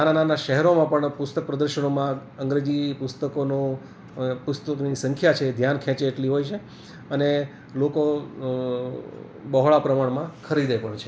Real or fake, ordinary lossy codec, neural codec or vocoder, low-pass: real; none; none; none